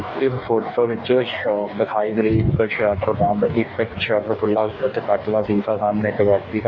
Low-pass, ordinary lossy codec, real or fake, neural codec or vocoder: 7.2 kHz; none; fake; codec, 44.1 kHz, 2.6 kbps, DAC